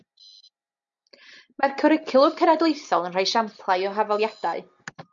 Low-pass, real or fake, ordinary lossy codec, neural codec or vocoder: 7.2 kHz; real; MP3, 48 kbps; none